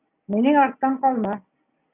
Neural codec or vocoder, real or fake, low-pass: none; real; 3.6 kHz